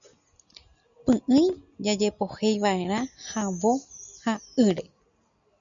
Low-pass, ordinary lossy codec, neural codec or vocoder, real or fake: 7.2 kHz; MP3, 96 kbps; none; real